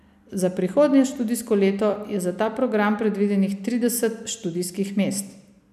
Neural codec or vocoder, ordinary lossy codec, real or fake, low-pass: none; none; real; 14.4 kHz